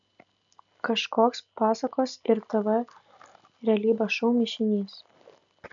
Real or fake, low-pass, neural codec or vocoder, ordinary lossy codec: real; 7.2 kHz; none; MP3, 96 kbps